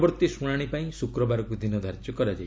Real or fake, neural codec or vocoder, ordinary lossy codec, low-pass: real; none; none; none